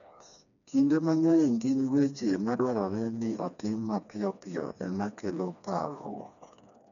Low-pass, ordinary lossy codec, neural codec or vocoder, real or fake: 7.2 kHz; none; codec, 16 kHz, 2 kbps, FreqCodec, smaller model; fake